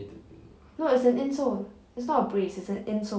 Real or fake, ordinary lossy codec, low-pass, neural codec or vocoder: real; none; none; none